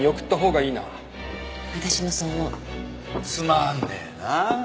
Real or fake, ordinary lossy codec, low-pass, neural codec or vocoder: real; none; none; none